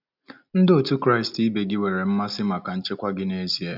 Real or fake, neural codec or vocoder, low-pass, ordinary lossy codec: real; none; 5.4 kHz; none